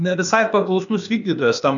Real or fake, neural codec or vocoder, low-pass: fake; codec, 16 kHz, 0.8 kbps, ZipCodec; 7.2 kHz